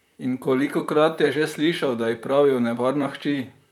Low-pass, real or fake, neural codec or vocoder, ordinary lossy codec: 19.8 kHz; fake; vocoder, 44.1 kHz, 128 mel bands, Pupu-Vocoder; none